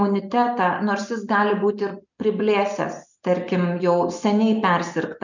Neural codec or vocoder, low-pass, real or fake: none; 7.2 kHz; real